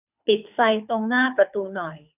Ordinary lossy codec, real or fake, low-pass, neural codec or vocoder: none; fake; 3.6 kHz; codec, 24 kHz, 6 kbps, HILCodec